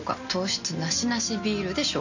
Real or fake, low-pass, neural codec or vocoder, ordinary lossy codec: real; 7.2 kHz; none; none